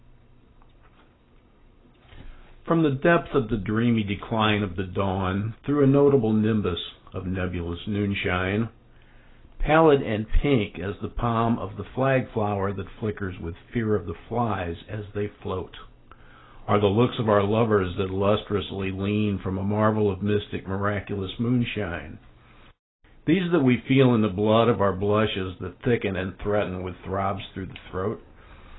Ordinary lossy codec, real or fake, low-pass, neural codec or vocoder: AAC, 16 kbps; real; 7.2 kHz; none